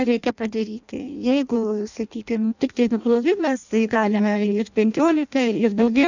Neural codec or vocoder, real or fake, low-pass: codec, 16 kHz in and 24 kHz out, 0.6 kbps, FireRedTTS-2 codec; fake; 7.2 kHz